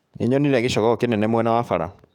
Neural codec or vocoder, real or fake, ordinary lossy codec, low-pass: codec, 44.1 kHz, 7.8 kbps, DAC; fake; none; 19.8 kHz